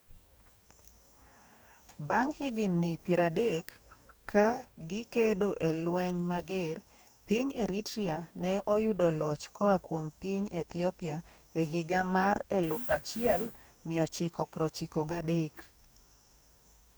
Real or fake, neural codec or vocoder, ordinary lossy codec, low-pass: fake; codec, 44.1 kHz, 2.6 kbps, DAC; none; none